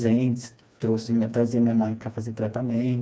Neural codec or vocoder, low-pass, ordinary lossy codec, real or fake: codec, 16 kHz, 2 kbps, FreqCodec, smaller model; none; none; fake